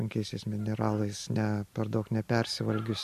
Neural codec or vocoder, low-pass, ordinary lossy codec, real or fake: vocoder, 44.1 kHz, 128 mel bands every 256 samples, BigVGAN v2; 14.4 kHz; MP3, 64 kbps; fake